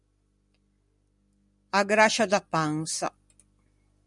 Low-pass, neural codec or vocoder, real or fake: 10.8 kHz; none; real